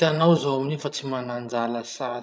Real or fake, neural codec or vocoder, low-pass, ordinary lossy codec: fake; codec, 16 kHz, 16 kbps, FreqCodec, larger model; none; none